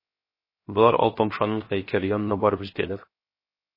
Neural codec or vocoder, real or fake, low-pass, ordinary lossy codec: codec, 16 kHz, 0.7 kbps, FocalCodec; fake; 5.4 kHz; MP3, 24 kbps